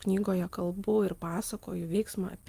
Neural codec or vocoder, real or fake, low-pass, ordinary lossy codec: none; real; 14.4 kHz; Opus, 32 kbps